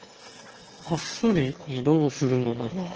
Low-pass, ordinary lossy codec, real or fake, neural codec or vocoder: 7.2 kHz; Opus, 16 kbps; fake; autoencoder, 22.05 kHz, a latent of 192 numbers a frame, VITS, trained on one speaker